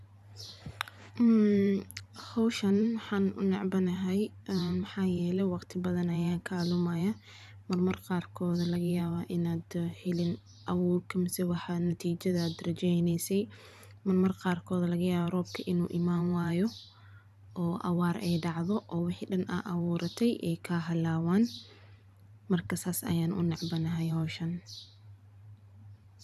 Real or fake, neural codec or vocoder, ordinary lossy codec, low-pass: fake; vocoder, 44.1 kHz, 128 mel bands every 512 samples, BigVGAN v2; none; 14.4 kHz